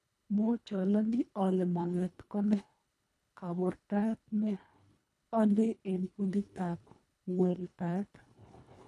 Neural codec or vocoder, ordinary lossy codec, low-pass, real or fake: codec, 24 kHz, 1.5 kbps, HILCodec; none; none; fake